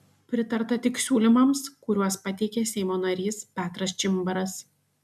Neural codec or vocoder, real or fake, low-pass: none; real; 14.4 kHz